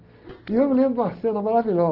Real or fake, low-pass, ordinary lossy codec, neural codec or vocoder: real; 5.4 kHz; Opus, 16 kbps; none